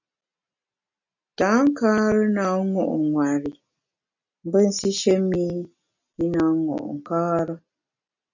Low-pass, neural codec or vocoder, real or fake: 7.2 kHz; none; real